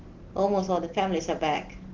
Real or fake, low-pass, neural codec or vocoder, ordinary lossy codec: real; 7.2 kHz; none; Opus, 16 kbps